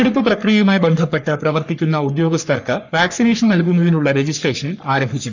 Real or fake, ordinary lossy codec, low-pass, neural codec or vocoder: fake; none; 7.2 kHz; codec, 44.1 kHz, 3.4 kbps, Pupu-Codec